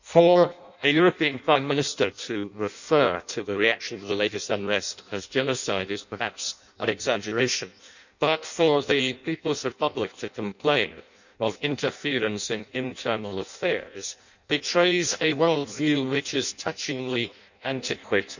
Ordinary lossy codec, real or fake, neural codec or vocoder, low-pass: none; fake; codec, 16 kHz in and 24 kHz out, 0.6 kbps, FireRedTTS-2 codec; 7.2 kHz